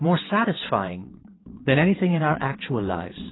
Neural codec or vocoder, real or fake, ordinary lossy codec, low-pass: vocoder, 22.05 kHz, 80 mel bands, WaveNeXt; fake; AAC, 16 kbps; 7.2 kHz